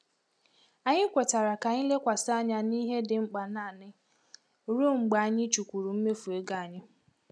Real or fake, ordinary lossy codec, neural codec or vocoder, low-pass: real; none; none; none